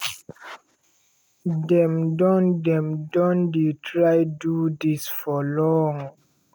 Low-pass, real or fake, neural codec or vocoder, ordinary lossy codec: none; real; none; none